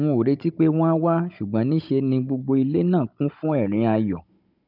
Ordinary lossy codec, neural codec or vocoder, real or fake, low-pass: none; codec, 16 kHz, 16 kbps, FunCodec, trained on Chinese and English, 50 frames a second; fake; 5.4 kHz